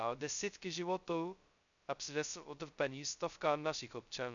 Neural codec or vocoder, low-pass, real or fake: codec, 16 kHz, 0.2 kbps, FocalCodec; 7.2 kHz; fake